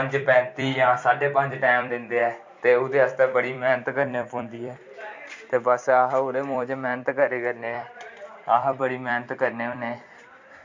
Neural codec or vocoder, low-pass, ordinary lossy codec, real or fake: vocoder, 44.1 kHz, 128 mel bands every 256 samples, BigVGAN v2; 7.2 kHz; MP3, 48 kbps; fake